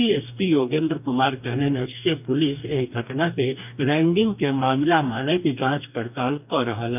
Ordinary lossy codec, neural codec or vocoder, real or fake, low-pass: none; codec, 44.1 kHz, 2.6 kbps, DAC; fake; 3.6 kHz